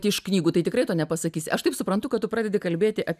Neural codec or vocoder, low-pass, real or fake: none; 14.4 kHz; real